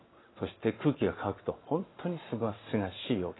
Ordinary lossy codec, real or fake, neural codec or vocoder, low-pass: AAC, 16 kbps; real; none; 7.2 kHz